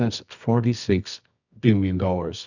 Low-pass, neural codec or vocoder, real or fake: 7.2 kHz; codec, 24 kHz, 0.9 kbps, WavTokenizer, medium music audio release; fake